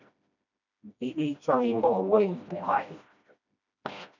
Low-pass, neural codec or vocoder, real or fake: 7.2 kHz; codec, 16 kHz, 1 kbps, FreqCodec, smaller model; fake